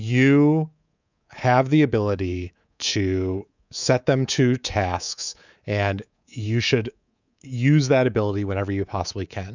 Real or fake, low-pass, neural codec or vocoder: fake; 7.2 kHz; codec, 24 kHz, 3.1 kbps, DualCodec